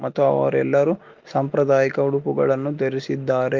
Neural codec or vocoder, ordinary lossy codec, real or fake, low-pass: none; Opus, 32 kbps; real; 7.2 kHz